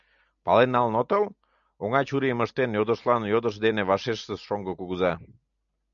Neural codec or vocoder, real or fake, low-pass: none; real; 7.2 kHz